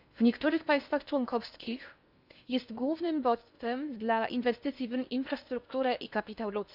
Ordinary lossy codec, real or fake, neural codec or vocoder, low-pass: none; fake; codec, 16 kHz in and 24 kHz out, 0.8 kbps, FocalCodec, streaming, 65536 codes; 5.4 kHz